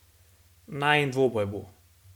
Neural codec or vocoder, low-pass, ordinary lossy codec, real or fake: none; 19.8 kHz; MP3, 96 kbps; real